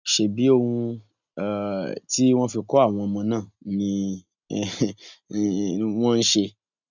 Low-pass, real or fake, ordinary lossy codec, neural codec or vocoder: 7.2 kHz; real; none; none